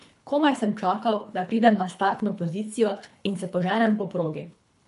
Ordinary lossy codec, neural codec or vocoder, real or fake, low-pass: AAC, 96 kbps; codec, 24 kHz, 3 kbps, HILCodec; fake; 10.8 kHz